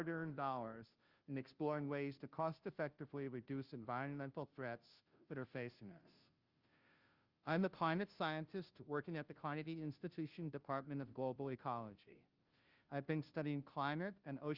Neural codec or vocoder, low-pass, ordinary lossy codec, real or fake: codec, 16 kHz, 0.5 kbps, FunCodec, trained on Chinese and English, 25 frames a second; 5.4 kHz; Opus, 32 kbps; fake